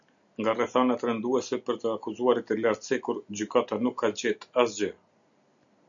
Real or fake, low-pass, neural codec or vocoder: real; 7.2 kHz; none